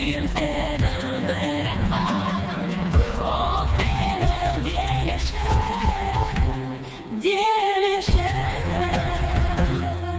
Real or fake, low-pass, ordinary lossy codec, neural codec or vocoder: fake; none; none; codec, 16 kHz, 2 kbps, FreqCodec, smaller model